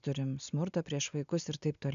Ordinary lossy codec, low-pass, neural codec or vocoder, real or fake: AAC, 96 kbps; 7.2 kHz; none; real